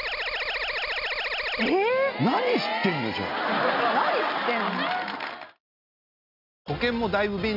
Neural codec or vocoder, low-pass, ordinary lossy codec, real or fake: none; 5.4 kHz; none; real